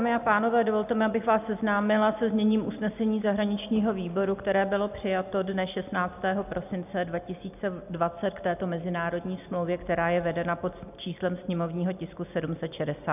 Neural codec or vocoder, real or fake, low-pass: none; real; 3.6 kHz